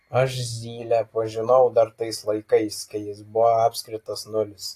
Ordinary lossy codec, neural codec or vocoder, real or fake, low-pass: AAC, 48 kbps; none; real; 14.4 kHz